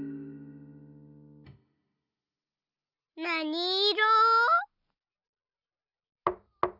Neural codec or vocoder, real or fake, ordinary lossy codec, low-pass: none; real; none; 5.4 kHz